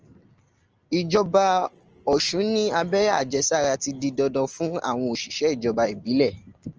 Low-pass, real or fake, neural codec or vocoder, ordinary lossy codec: 7.2 kHz; real; none; Opus, 24 kbps